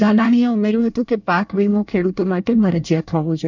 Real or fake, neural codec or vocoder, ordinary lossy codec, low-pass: fake; codec, 24 kHz, 1 kbps, SNAC; none; 7.2 kHz